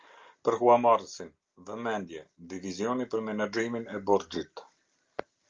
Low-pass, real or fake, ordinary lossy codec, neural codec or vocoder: 7.2 kHz; real; Opus, 32 kbps; none